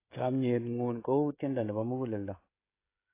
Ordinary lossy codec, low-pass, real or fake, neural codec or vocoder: AAC, 24 kbps; 3.6 kHz; fake; codec, 16 kHz, 16 kbps, FreqCodec, smaller model